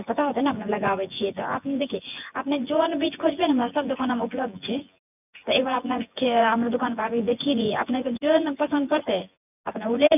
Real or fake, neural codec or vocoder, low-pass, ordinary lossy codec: fake; vocoder, 24 kHz, 100 mel bands, Vocos; 3.6 kHz; none